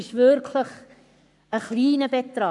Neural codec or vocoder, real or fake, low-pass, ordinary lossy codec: codec, 44.1 kHz, 7.8 kbps, Pupu-Codec; fake; 10.8 kHz; none